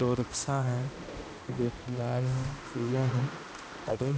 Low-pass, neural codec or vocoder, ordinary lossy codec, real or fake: none; codec, 16 kHz, 1 kbps, X-Codec, HuBERT features, trained on balanced general audio; none; fake